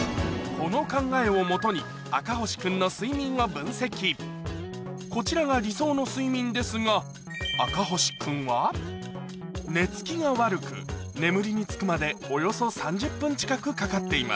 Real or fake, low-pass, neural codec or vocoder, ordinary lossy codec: real; none; none; none